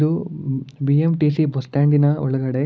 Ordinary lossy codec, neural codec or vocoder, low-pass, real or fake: none; none; none; real